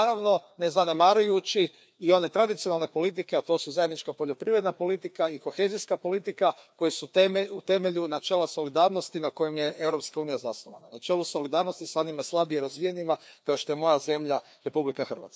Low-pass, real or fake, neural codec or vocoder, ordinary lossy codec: none; fake; codec, 16 kHz, 2 kbps, FreqCodec, larger model; none